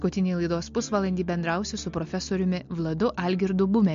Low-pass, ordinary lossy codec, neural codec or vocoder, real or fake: 7.2 kHz; MP3, 48 kbps; none; real